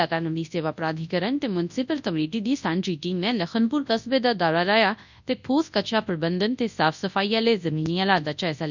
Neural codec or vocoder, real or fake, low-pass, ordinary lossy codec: codec, 24 kHz, 0.9 kbps, WavTokenizer, large speech release; fake; 7.2 kHz; none